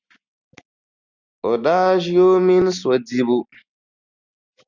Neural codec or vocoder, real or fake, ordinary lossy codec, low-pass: none; real; Opus, 64 kbps; 7.2 kHz